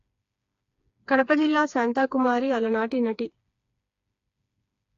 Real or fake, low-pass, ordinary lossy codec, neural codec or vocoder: fake; 7.2 kHz; AAC, 48 kbps; codec, 16 kHz, 4 kbps, FreqCodec, smaller model